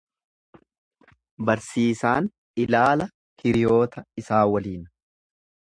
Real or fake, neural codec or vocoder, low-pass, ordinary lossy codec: real; none; 9.9 kHz; MP3, 64 kbps